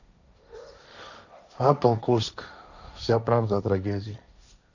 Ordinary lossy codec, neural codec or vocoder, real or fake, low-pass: none; codec, 16 kHz, 1.1 kbps, Voila-Tokenizer; fake; 7.2 kHz